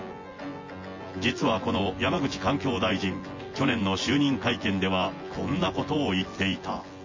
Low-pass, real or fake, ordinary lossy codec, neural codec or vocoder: 7.2 kHz; fake; MP3, 32 kbps; vocoder, 24 kHz, 100 mel bands, Vocos